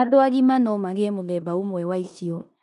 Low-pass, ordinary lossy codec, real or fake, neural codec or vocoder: 10.8 kHz; none; fake; codec, 16 kHz in and 24 kHz out, 0.9 kbps, LongCat-Audio-Codec, four codebook decoder